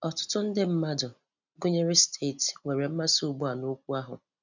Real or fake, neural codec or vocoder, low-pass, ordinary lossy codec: real; none; 7.2 kHz; none